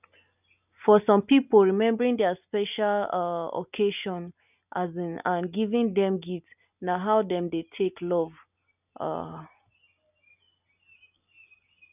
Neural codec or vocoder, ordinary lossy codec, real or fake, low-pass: none; none; real; 3.6 kHz